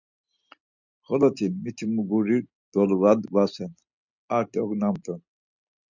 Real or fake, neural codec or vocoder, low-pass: real; none; 7.2 kHz